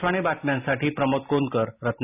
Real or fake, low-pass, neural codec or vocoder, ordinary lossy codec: real; 3.6 kHz; none; none